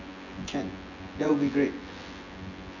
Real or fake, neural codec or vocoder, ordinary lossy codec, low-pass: fake; vocoder, 24 kHz, 100 mel bands, Vocos; none; 7.2 kHz